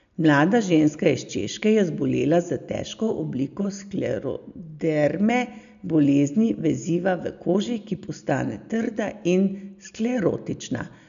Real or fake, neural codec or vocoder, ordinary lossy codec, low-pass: real; none; none; 7.2 kHz